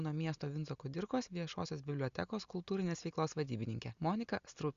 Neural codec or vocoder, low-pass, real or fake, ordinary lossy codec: none; 7.2 kHz; real; Opus, 64 kbps